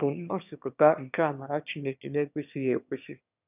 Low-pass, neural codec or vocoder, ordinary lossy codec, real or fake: 3.6 kHz; autoencoder, 22.05 kHz, a latent of 192 numbers a frame, VITS, trained on one speaker; none; fake